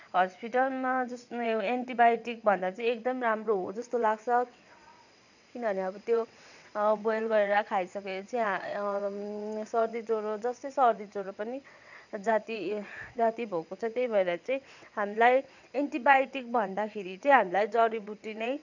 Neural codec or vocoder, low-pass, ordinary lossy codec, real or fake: vocoder, 22.05 kHz, 80 mel bands, WaveNeXt; 7.2 kHz; none; fake